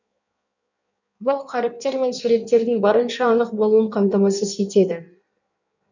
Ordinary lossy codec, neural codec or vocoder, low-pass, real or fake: none; codec, 16 kHz in and 24 kHz out, 1.1 kbps, FireRedTTS-2 codec; 7.2 kHz; fake